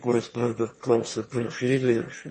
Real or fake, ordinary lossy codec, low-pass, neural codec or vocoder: fake; MP3, 32 kbps; 9.9 kHz; autoencoder, 22.05 kHz, a latent of 192 numbers a frame, VITS, trained on one speaker